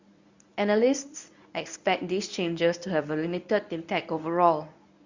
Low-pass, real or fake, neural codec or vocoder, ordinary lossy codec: 7.2 kHz; fake; codec, 24 kHz, 0.9 kbps, WavTokenizer, medium speech release version 1; Opus, 64 kbps